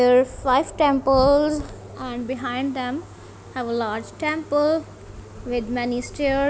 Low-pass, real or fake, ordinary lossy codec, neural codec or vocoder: none; real; none; none